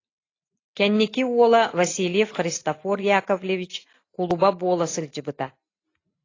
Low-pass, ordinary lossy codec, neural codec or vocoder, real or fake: 7.2 kHz; AAC, 32 kbps; none; real